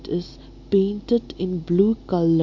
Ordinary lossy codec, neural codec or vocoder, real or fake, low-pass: MP3, 48 kbps; none; real; 7.2 kHz